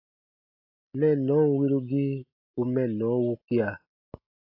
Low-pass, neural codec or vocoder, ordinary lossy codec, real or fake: 5.4 kHz; none; AAC, 32 kbps; real